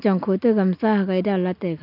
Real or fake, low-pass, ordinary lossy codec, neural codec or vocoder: real; 5.4 kHz; none; none